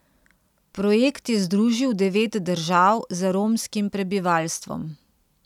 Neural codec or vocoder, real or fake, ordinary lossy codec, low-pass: none; real; none; 19.8 kHz